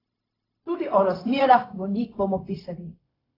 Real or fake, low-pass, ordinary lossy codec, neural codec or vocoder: fake; 5.4 kHz; AAC, 24 kbps; codec, 16 kHz, 0.4 kbps, LongCat-Audio-Codec